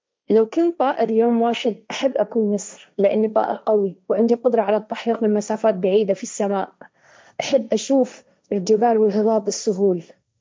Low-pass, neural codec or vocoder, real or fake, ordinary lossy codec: none; codec, 16 kHz, 1.1 kbps, Voila-Tokenizer; fake; none